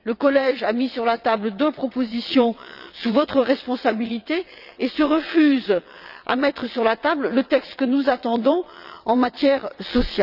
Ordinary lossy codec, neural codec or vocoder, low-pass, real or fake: none; vocoder, 22.05 kHz, 80 mel bands, WaveNeXt; 5.4 kHz; fake